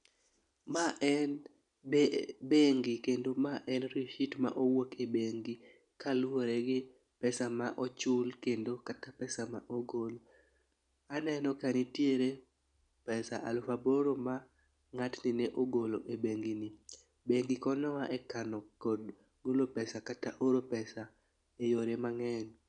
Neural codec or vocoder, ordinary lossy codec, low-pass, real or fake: none; none; 9.9 kHz; real